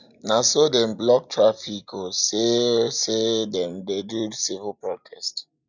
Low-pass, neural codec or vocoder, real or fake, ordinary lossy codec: 7.2 kHz; none; real; none